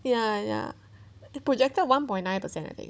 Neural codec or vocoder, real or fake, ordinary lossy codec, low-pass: codec, 16 kHz, 16 kbps, FunCodec, trained on Chinese and English, 50 frames a second; fake; none; none